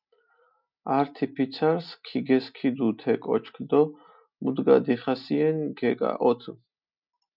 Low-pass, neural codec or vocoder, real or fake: 5.4 kHz; none; real